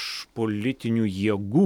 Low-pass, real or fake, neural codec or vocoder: 19.8 kHz; real; none